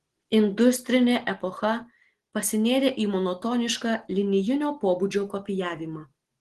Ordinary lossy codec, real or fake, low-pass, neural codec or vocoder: Opus, 16 kbps; real; 10.8 kHz; none